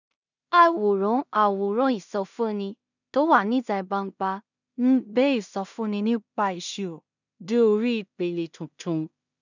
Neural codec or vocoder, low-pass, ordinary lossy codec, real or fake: codec, 16 kHz in and 24 kHz out, 0.4 kbps, LongCat-Audio-Codec, two codebook decoder; 7.2 kHz; none; fake